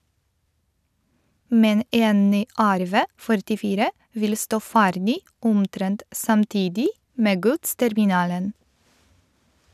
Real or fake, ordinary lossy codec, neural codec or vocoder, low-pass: real; none; none; 14.4 kHz